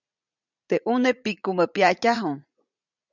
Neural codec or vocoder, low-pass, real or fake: vocoder, 44.1 kHz, 80 mel bands, Vocos; 7.2 kHz; fake